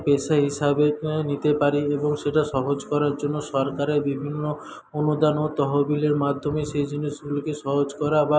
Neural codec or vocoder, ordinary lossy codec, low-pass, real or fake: none; none; none; real